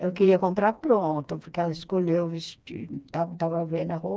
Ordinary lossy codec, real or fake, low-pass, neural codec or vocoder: none; fake; none; codec, 16 kHz, 2 kbps, FreqCodec, smaller model